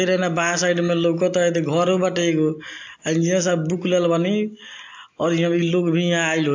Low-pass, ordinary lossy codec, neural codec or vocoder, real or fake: 7.2 kHz; AAC, 48 kbps; none; real